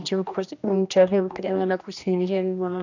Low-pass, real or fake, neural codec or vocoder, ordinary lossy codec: 7.2 kHz; fake; codec, 16 kHz, 1 kbps, X-Codec, HuBERT features, trained on general audio; none